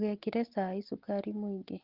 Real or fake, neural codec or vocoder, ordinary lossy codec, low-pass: real; none; Opus, 16 kbps; 5.4 kHz